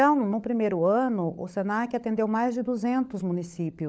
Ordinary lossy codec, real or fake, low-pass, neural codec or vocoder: none; fake; none; codec, 16 kHz, 16 kbps, FunCodec, trained on LibriTTS, 50 frames a second